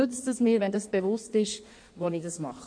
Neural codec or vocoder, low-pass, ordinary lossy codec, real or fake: codec, 16 kHz in and 24 kHz out, 1.1 kbps, FireRedTTS-2 codec; 9.9 kHz; none; fake